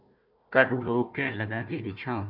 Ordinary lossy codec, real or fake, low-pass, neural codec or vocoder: MP3, 48 kbps; fake; 5.4 kHz; codec, 16 kHz, 1 kbps, FunCodec, trained on Chinese and English, 50 frames a second